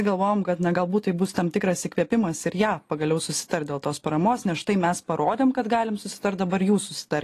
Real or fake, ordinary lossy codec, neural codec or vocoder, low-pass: real; AAC, 48 kbps; none; 14.4 kHz